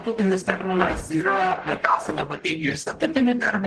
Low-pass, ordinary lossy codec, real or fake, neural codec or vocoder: 10.8 kHz; Opus, 16 kbps; fake; codec, 44.1 kHz, 0.9 kbps, DAC